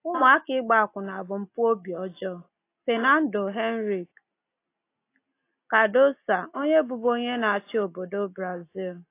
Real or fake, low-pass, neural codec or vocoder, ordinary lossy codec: real; 3.6 kHz; none; AAC, 24 kbps